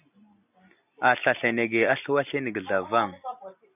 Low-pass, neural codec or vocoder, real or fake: 3.6 kHz; none; real